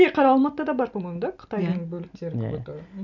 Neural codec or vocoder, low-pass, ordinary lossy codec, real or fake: none; 7.2 kHz; none; real